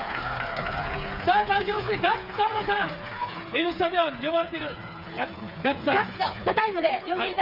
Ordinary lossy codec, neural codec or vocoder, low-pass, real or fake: none; codec, 16 kHz, 4 kbps, FreqCodec, smaller model; 5.4 kHz; fake